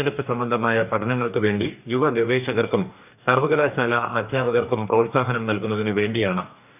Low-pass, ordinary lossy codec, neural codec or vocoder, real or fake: 3.6 kHz; none; codec, 44.1 kHz, 2.6 kbps, DAC; fake